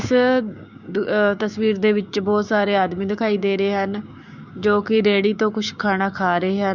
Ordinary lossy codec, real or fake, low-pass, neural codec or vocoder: Opus, 64 kbps; fake; 7.2 kHz; codec, 16 kHz, 6 kbps, DAC